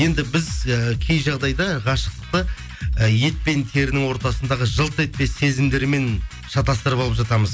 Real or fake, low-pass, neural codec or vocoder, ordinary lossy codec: real; none; none; none